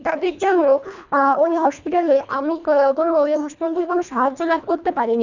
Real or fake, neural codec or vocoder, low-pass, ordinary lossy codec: fake; codec, 24 kHz, 1.5 kbps, HILCodec; 7.2 kHz; none